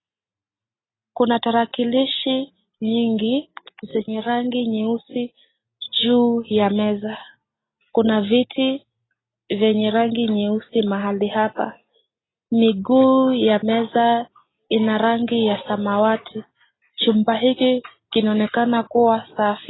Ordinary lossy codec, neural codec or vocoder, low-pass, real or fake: AAC, 16 kbps; none; 7.2 kHz; real